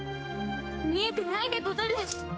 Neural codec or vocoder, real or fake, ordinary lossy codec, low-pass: codec, 16 kHz, 2 kbps, X-Codec, HuBERT features, trained on general audio; fake; none; none